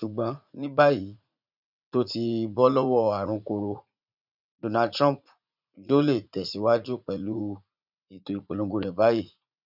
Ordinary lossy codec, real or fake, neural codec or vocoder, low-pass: none; fake; vocoder, 44.1 kHz, 80 mel bands, Vocos; 5.4 kHz